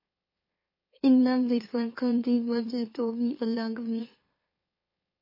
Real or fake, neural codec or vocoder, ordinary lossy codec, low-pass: fake; autoencoder, 44.1 kHz, a latent of 192 numbers a frame, MeloTTS; MP3, 24 kbps; 5.4 kHz